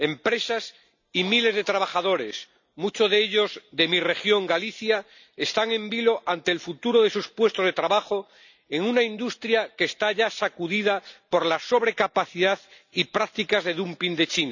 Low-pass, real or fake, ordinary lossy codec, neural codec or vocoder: 7.2 kHz; real; none; none